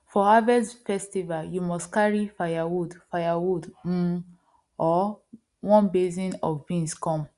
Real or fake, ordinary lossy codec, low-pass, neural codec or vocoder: real; Opus, 64 kbps; 10.8 kHz; none